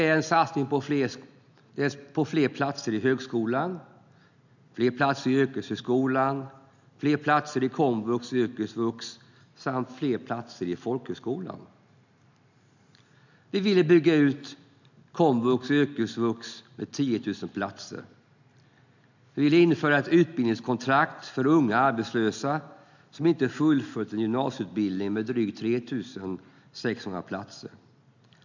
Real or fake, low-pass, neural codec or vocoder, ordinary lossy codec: real; 7.2 kHz; none; none